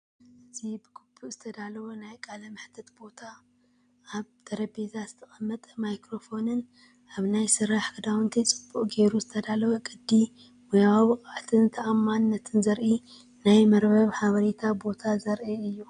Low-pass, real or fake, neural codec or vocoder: 9.9 kHz; real; none